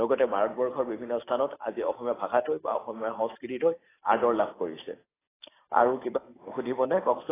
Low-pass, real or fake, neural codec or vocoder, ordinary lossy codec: 3.6 kHz; real; none; AAC, 16 kbps